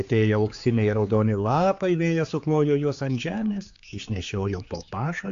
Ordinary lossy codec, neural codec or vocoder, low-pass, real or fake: AAC, 64 kbps; codec, 16 kHz, 4 kbps, X-Codec, HuBERT features, trained on general audio; 7.2 kHz; fake